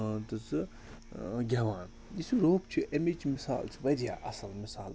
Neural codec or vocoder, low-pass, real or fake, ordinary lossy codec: none; none; real; none